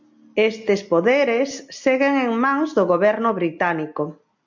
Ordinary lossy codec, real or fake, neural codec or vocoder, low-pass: MP3, 48 kbps; real; none; 7.2 kHz